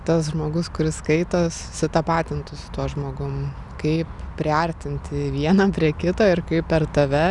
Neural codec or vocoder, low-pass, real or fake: none; 10.8 kHz; real